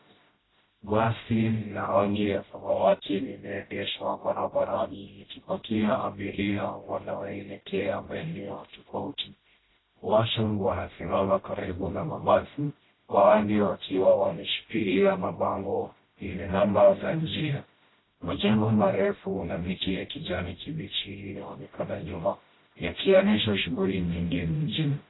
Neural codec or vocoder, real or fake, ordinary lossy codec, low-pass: codec, 16 kHz, 0.5 kbps, FreqCodec, smaller model; fake; AAC, 16 kbps; 7.2 kHz